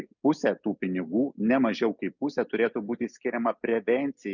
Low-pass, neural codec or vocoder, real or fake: 7.2 kHz; none; real